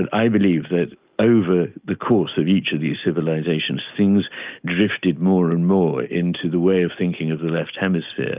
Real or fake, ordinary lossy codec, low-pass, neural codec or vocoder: real; Opus, 32 kbps; 3.6 kHz; none